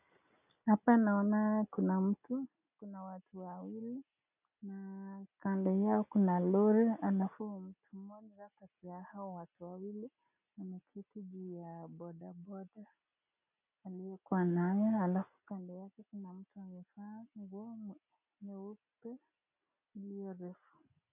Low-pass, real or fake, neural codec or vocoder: 3.6 kHz; real; none